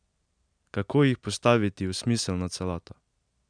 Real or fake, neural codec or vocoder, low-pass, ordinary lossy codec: real; none; 9.9 kHz; none